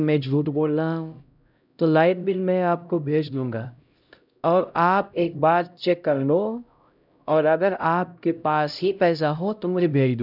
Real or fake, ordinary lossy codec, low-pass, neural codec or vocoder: fake; none; 5.4 kHz; codec, 16 kHz, 0.5 kbps, X-Codec, HuBERT features, trained on LibriSpeech